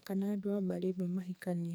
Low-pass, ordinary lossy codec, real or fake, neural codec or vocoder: none; none; fake; codec, 44.1 kHz, 2.6 kbps, SNAC